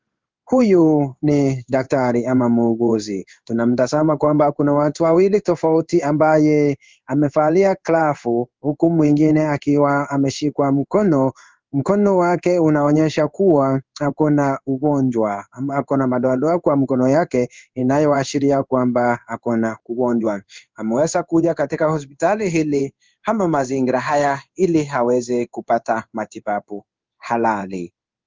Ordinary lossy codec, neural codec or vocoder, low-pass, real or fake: Opus, 32 kbps; codec, 16 kHz in and 24 kHz out, 1 kbps, XY-Tokenizer; 7.2 kHz; fake